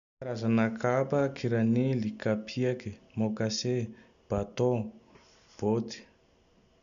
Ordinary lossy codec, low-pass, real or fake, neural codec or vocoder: none; 7.2 kHz; real; none